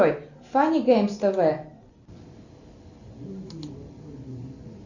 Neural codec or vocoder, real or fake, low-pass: none; real; 7.2 kHz